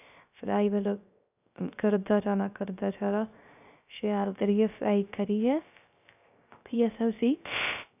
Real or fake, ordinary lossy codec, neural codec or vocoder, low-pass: fake; none; codec, 16 kHz, 0.3 kbps, FocalCodec; 3.6 kHz